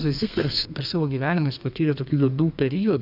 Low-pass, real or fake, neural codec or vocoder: 5.4 kHz; fake; codec, 32 kHz, 1.9 kbps, SNAC